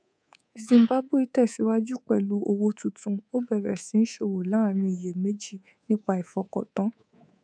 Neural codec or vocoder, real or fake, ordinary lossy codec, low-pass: codec, 24 kHz, 3.1 kbps, DualCodec; fake; none; 9.9 kHz